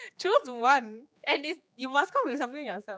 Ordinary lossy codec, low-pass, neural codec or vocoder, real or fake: none; none; codec, 16 kHz, 2 kbps, X-Codec, HuBERT features, trained on general audio; fake